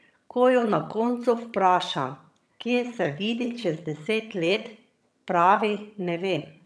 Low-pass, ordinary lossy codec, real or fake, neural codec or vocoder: none; none; fake; vocoder, 22.05 kHz, 80 mel bands, HiFi-GAN